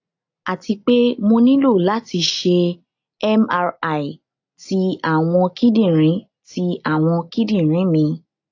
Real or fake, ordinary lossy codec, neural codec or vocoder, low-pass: real; AAC, 48 kbps; none; 7.2 kHz